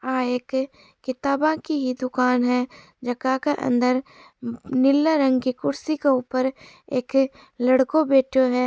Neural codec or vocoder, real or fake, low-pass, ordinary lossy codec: none; real; none; none